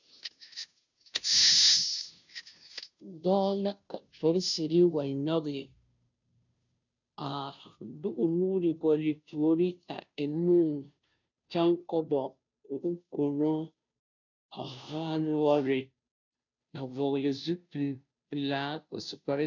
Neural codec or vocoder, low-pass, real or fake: codec, 16 kHz, 0.5 kbps, FunCodec, trained on Chinese and English, 25 frames a second; 7.2 kHz; fake